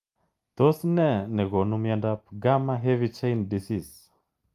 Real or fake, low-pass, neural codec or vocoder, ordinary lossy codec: real; 14.4 kHz; none; Opus, 32 kbps